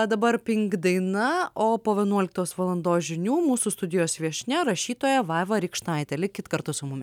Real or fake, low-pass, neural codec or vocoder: real; 19.8 kHz; none